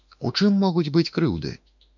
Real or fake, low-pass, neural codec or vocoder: fake; 7.2 kHz; autoencoder, 48 kHz, 32 numbers a frame, DAC-VAE, trained on Japanese speech